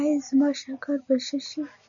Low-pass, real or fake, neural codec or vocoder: 7.2 kHz; real; none